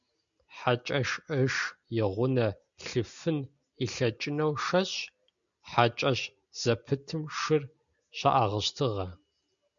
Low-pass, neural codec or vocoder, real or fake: 7.2 kHz; none; real